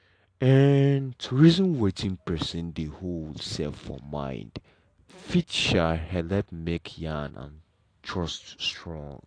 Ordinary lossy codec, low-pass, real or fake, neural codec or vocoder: AAC, 48 kbps; 9.9 kHz; real; none